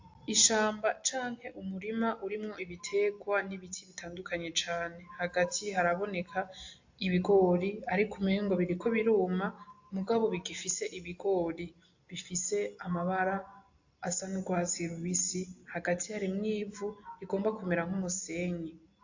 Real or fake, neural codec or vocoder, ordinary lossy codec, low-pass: real; none; AAC, 48 kbps; 7.2 kHz